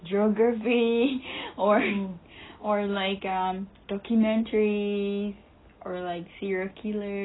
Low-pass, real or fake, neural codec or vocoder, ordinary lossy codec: 7.2 kHz; real; none; AAC, 16 kbps